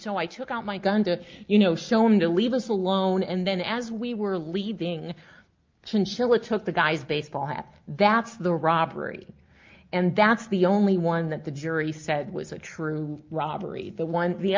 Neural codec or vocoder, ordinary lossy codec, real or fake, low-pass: none; Opus, 24 kbps; real; 7.2 kHz